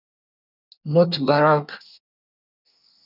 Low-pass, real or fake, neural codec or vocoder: 5.4 kHz; fake; codec, 24 kHz, 1 kbps, SNAC